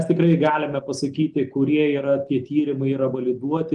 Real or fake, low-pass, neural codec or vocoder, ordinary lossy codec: real; 10.8 kHz; none; Opus, 24 kbps